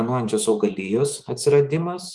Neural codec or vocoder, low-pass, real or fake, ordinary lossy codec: codec, 24 kHz, 3.1 kbps, DualCodec; 10.8 kHz; fake; Opus, 24 kbps